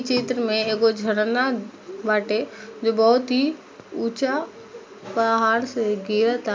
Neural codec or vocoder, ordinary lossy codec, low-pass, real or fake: none; none; none; real